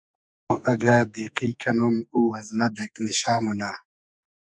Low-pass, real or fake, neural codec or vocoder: 9.9 kHz; fake; codec, 44.1 kHz, 2.6 kbps, SNAC